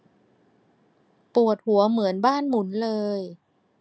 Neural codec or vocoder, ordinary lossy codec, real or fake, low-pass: none; none; real; none